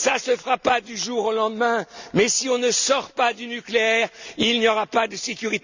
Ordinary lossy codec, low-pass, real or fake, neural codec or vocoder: Opus, 64 kbps; 7.2 kHz; real; none